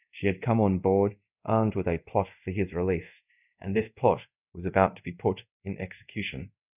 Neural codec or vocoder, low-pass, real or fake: codec, 24 kHz, 0.5 kbps, DualCodec; 3.6 kHz; fake